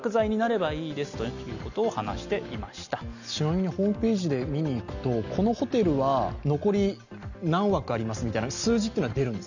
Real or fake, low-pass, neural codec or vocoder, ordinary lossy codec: real; 7.2 kHz; none; none